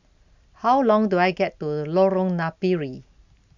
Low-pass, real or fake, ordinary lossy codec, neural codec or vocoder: 7.2 kHz; real; none; none